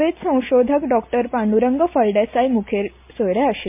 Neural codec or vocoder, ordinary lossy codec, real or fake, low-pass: none; MP3, 32 kbps; real; 3.6 kHz